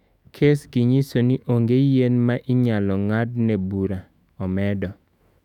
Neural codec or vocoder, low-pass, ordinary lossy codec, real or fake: autoencoder, 48 kHz, 128 numbers a frame, DAC-VAE, trained on Japanese speech; 19.8 kHz; none; fake